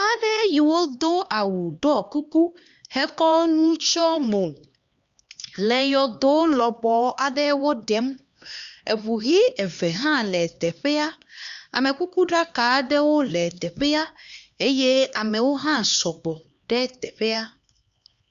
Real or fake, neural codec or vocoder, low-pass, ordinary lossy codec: fake; codec, 16 kHz, 2 kbps, X-Codec, HuBERT features, trained on LibriSpeech; 7.2 kHz; Opus, 64 kbps